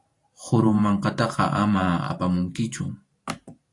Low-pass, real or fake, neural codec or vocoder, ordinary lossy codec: 10.8 kHz; fake; vocoder, 44.1 kHz, 128 mel bands every 512 samples, BigVGAN v2; AAC, 48 kbps